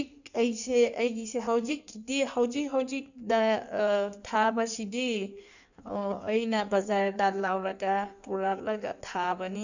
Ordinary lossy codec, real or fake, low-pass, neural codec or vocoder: none; fake; 7.2 kHz; codec, 16 kHz in and 24 kHz out, 1.1 kbps, FireRedTTS-2 codec